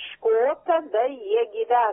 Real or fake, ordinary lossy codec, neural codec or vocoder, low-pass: real; AAC, 16 kbps; none; 7.2 kHz